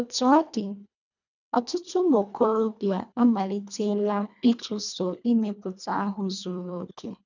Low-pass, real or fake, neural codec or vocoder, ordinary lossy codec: 7.2 kHz; fake; codec, 24 kHz, 1.5 kbps, HILCodec; none